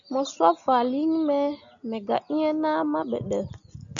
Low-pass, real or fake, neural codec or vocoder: 7.2 kHz; real; none